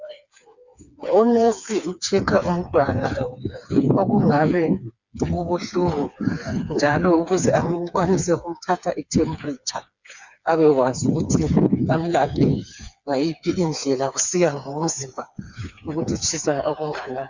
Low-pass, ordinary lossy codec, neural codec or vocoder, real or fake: 7.2 kHz; Opus, 64 kbps; codec, 16 kHz, 4 kbps, FreqCodec, smaller model; fake